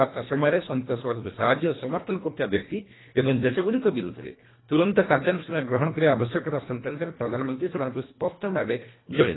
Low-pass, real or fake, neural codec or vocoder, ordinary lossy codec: 7.2 kHz; fake; codec, 24 kHz, 1.5 kbps, HILCodec; AAC, 16 kbps